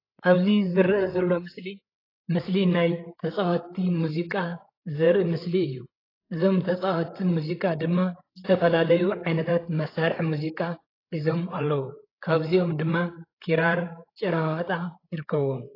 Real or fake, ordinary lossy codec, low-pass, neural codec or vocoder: fake; AAC, 24 kbps; 5.4 kHz; codec, 16 kHz, 8 kbps, FreqCodec, larger model